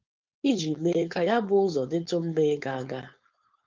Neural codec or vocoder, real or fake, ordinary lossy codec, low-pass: codec, 16 kHz, 4.8 kbps, FACodec; fake; Opus, 32 kbps; 7.2 kHz